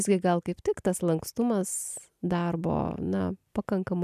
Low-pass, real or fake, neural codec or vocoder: 14.4 kHz; real; none